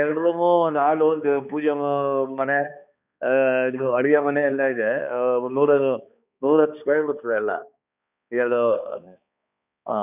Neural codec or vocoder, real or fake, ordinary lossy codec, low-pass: codec, 16 kHz, 2 kbps, X-Codec, HuBERT features, trained on balanced general audio; fake; none; 3.6 kHz